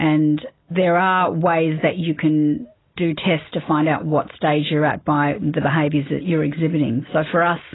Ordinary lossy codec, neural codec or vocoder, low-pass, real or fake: AAC, 16 kbps; none; 7.2 kHz; real